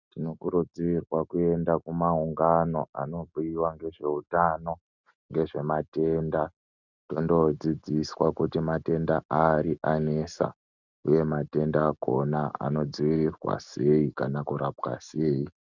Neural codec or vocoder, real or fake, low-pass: none; real; 7.2 kHz